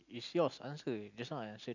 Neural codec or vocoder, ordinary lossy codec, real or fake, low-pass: none; none; real; 7.2 kHz